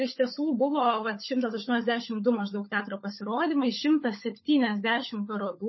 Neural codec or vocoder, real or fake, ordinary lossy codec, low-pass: codec, 16 kHz, 16 kbps, FunCodec, trained on LibriTTS, 50 frames a second; fake; MP3, 24 kbps; 7.2 kHz